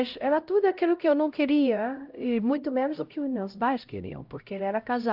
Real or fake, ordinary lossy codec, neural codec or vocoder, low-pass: fake; Opus, 24 kbps; codec, 16 kHz, 0.5 kbps, X-Codec, HuBERT features, trained on LibriSpeech; 5.4 kHz